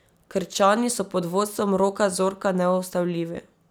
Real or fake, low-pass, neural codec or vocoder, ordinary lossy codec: real; none; none; none